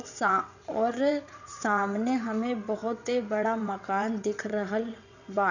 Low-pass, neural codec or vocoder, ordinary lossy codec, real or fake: 7.2 kHz; vocoder, 22.05 kHz, 80 mel bands, WaveNeXt; none; fake